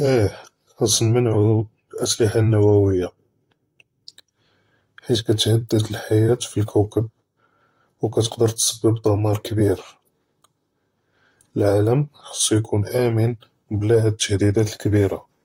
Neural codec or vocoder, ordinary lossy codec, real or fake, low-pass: vocoder, 44.1 kHz, 128 mel bands, Pupu-Vocoder; AAC, 48 kbps; fake; 19.8 kHz